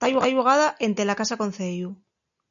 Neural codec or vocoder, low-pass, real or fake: none; 7.2 kHz; real